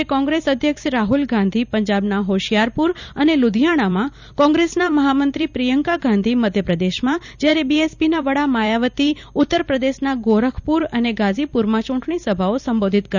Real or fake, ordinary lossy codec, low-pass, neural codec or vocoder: fake; none; 7.2 kHz; vocoder, 44.1 kHz, 128 mel bands every 256 samples, BigVGAN v2